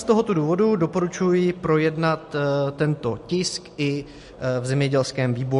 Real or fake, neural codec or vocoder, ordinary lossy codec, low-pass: real; none; MP3, 48 kbps; 14.4 kHz